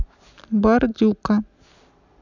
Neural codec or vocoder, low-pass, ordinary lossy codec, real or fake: none; 7.2 kHz; none; real